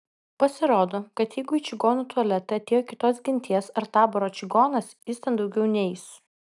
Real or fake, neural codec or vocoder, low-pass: real; none; 10.8 kHz